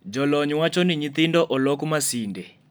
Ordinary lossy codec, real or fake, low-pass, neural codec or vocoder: none; real; none; none